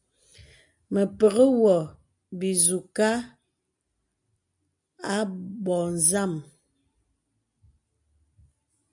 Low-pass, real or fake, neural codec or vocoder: 10.8 kHz; real; none